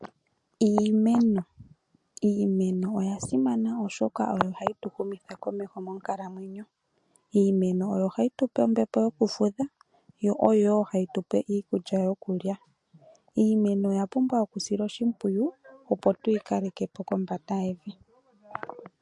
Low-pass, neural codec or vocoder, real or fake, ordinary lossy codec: 10.8 kHz; none; real; MP3, 48 kbps